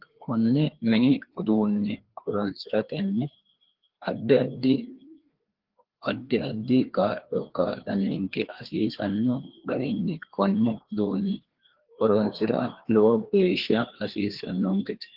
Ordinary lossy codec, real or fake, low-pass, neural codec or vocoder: Opus, 16 kbps; fake; 5.4 kHz; codec, 16 kHz, 2 kbps, FreqCodec, larger model